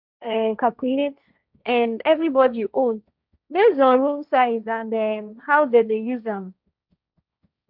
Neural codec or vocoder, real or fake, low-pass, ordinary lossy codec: codec, 16 kHz, 1.1 kbps, Voila-Tokenizer; fake; 5.4 kHz; none